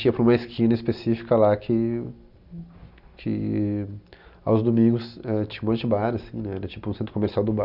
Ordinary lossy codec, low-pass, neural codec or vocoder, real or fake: none; 5.4 kHz; none; real